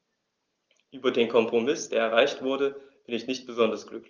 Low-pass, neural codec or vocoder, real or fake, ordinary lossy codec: 7.2 kHz; none; real; Opus, 32 kbps